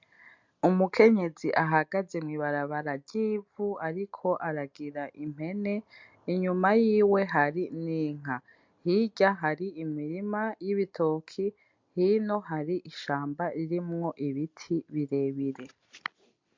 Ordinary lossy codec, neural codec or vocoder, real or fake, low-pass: MP3, 64 kbps; none; real; 7.2 kHz